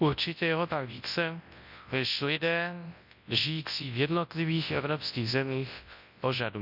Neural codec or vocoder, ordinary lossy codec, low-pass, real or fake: codec, 24 kHz, 0.9 kbps, WavTokenizer, large speech release; none; 5.4 kHz; fake